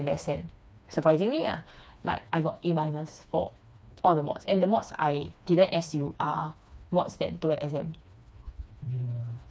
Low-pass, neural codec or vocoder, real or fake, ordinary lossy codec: none; codec, 16 kHz, 2 kbps, FreqCodec, smaller model; fake; none